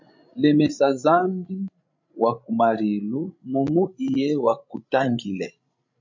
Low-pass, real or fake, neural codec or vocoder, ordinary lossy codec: 7.2 kHz; fake; codec, 16 kHz, 16 kbps, FreqCodec, larger model; MP3, 64 kbps